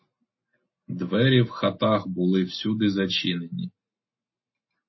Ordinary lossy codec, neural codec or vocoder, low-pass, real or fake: MP3, 24 kbps; none; 7.2 kHz; real